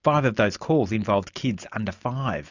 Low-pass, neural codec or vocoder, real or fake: 7.2 kHz; none; real